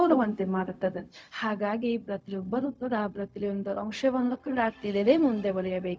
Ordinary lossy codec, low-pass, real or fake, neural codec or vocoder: none; none; fake; codec, 16 kHz, 0.4 kbps, LongCat-Audio-Codec